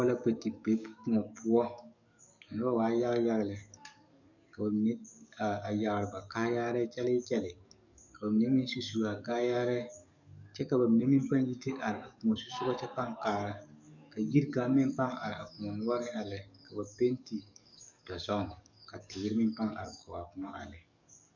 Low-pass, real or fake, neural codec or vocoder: 7.2 kHz; fake; codec, 44.1 kHz, 7.8 kbps, DAC